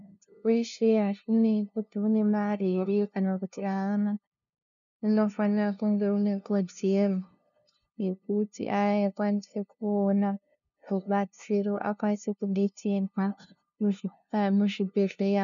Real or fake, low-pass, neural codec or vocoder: fake; 7.2 kHz; codec, 16 kHz, 0.5 kbps, FunCodec, trained on LibriTTS, 25 frames a second